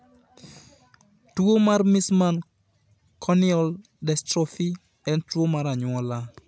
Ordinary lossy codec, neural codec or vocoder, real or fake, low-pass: none; none; real; none